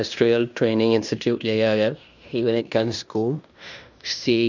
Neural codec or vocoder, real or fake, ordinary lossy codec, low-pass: codec, 16 kHz in and 24 kHz out, 0.9 kbps, LongCat-Audio-Codec, fine tuned four codebook decoder; fake; none; 7.2 kHz